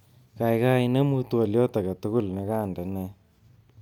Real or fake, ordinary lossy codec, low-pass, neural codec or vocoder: real; none; 19.8 kHz; none